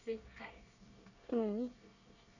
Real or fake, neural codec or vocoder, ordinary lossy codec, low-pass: fake; codec, 24 kHz, 1 kbps, SNAC; none; 7.2 kHz